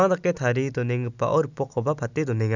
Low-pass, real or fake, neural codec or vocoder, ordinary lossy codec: 7.2 kHz; real; none; none